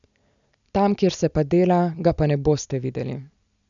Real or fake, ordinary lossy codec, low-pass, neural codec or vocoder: real; none; 7.2 kHz; none